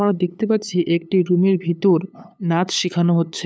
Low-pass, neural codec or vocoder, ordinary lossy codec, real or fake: none; codec, 16 kHz, 8 kbps, FunCodec, trained on LibriTTS, 25 frames a second; none; fake